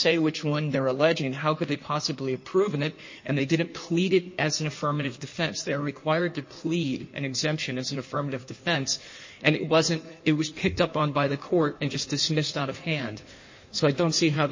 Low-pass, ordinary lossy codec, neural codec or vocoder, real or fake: 7.2 kHz; MP3, 32 kbps; codec, 16 kHz in and 24 kHz out, 1.1 kbps, FireRedTTS-2 codec; fake